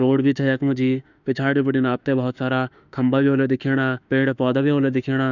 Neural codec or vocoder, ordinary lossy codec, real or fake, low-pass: autoencoder, 48 kHz, 32 numbers a frame, DAC-VAE, trained on Japanese speech; none; fake; 7.2 kHz